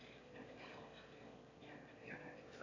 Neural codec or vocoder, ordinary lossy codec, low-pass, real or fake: autoencoder, 22.05 kHz, a latent of 192 numbers a frame, VITS, trained on one speaker; MP3, 64 kbps; 7.2 kHz; fake